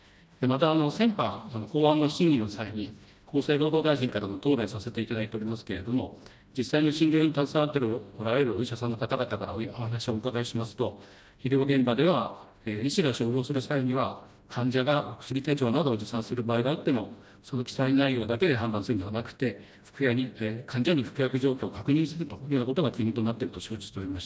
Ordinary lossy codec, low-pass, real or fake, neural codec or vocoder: none; none; fake; codec, 16 kHz, 1 kbps, FreqCodec, smaller model